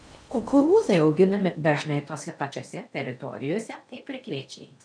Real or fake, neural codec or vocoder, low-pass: fake; codec, 16 kHz in and 24 kHz out, 0.6 kbps, FocalCodec, streaming, 4096 codes; 9.9 kHz